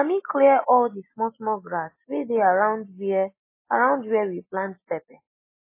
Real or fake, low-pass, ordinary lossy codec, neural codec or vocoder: real; 3.6 kHz; MP3, 16 kbps; none